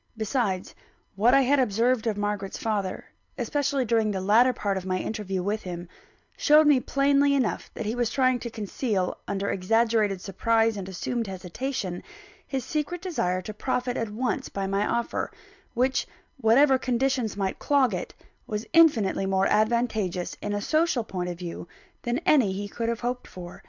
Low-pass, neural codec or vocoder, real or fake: 7.2 kHz; none; real